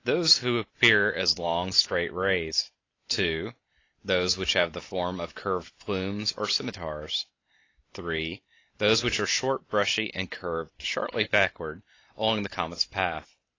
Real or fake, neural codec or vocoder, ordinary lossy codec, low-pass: real; none; AAC, 32 kbps; 7.2 kHz